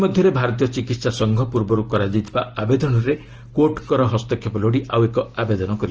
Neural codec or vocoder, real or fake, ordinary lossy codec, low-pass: none; real; Opus, 16 kbps; 7.2 kHz